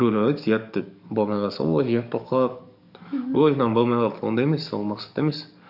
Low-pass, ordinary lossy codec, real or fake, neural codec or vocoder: 5.4 kHz; none; fake; codec, 44.1 kHz, 7.8 kbps, DAC